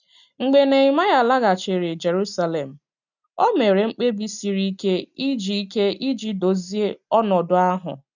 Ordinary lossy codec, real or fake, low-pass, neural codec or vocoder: none; real; 7.2 kHz; none